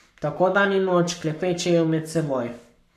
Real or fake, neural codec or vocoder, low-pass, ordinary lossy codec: fake; codec, 44.1 kHz, 7.8 kbps, Pupu-Codec; 14.4 kHz; none